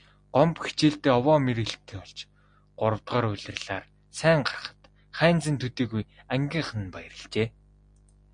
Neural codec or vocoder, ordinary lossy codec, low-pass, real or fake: none; MP3, 64 kbps; 9.9 kHz; real